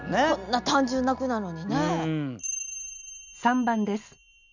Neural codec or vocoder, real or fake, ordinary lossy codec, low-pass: none; real; none; 7.2 kHz